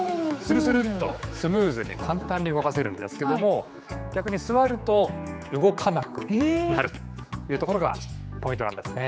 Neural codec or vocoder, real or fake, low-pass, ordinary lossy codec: codec, 16 kHz, 4 kbps, X-Codec, HuBERT features, trained on general audio; fake; none; none